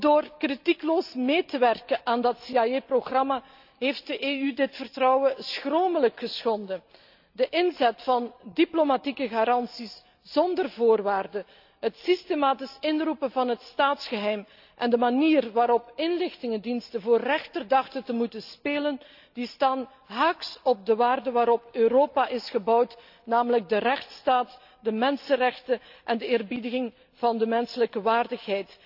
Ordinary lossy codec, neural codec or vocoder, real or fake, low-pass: AAC, 48 kbps; none; real; 5.4 kHz